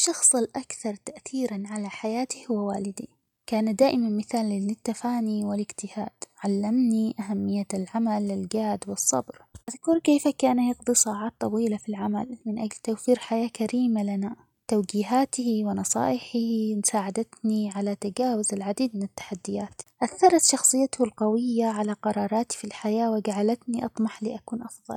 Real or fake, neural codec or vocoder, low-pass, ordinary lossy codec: real; none; 19.8 kHz; none